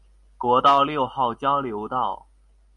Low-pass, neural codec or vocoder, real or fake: 10.8 kHz; none; real